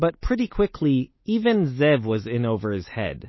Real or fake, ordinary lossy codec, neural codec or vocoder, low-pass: real; MP3, 24 kbps; none; 7.2 kHz